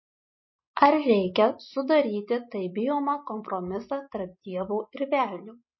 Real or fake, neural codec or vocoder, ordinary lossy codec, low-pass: real; none; MP3, 24 kbps; 7.2 kHz